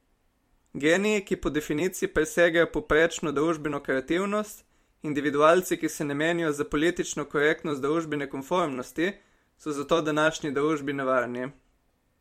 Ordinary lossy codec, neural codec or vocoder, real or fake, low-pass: MP3, 64 kbps; vocoder, 44.1 kHz, 128 mel bands every 256 samples, BigVGAN v2; fake; 19.8 kHz